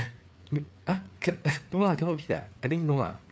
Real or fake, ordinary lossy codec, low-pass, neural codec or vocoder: fake; none; none; codec, 16 kHz, 4 kbps, FreqCodec, larger model